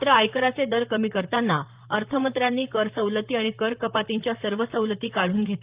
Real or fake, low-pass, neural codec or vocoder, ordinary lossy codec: fake; 3.6 kHz; vocoder, 44.1 kHz, 128 mel bands, Pupu-Vocoder; Opus, 24 kbps